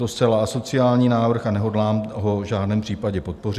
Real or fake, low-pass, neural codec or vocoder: real; 14.4 kHz; none